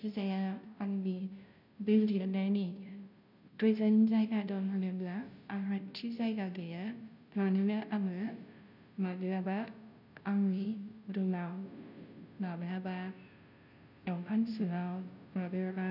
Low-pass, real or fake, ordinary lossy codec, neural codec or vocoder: 5.4 kHz; fake; none; codec, 16 kHz, 0.5 kbps, FunCodec, trained on Chinese and English, 25 frames a second